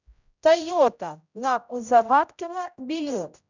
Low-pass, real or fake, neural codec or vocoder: 7.2 kHz; fake; codec, 16 kHz, 0.5 kbps, X-Codec, HuBERT features, trained on general audio